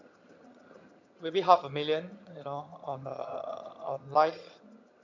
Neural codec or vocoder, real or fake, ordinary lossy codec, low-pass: vocoder, 22.05 kHz, 80 mel bands, HiFi-GAN; fake; MP3, 64 kbps; 7.2 kHz